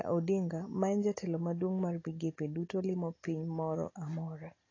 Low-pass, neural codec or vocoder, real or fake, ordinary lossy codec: 7.2 kHz; none; real; AAC, 32 kbps